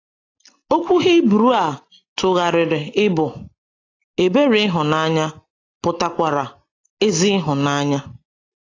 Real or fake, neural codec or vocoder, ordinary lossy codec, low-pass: real; none; AAC, 32 kbps; 7.2 kHz